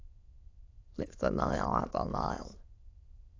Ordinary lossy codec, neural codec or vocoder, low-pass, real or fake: AAC, 32 kbps; autoencoder, 22.05 kHz, a latent of 192 numbers a frame, VITS, trained on many speakers; 7.2 kHz; fake